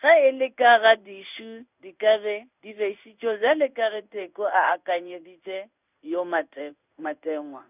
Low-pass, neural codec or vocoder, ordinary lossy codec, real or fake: 3.6 kHz; codec, 16 kHz in and 24 kHz out, 1 kbps, XY-Tokenizer; none; fake